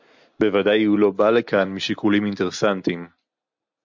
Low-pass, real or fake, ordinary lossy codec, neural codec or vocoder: 7.2 kHz; real; MP3, 64 kbps; none